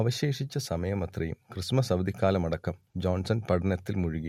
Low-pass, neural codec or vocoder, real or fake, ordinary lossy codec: 19.8 kHz; none; real; MP3, 64 kbps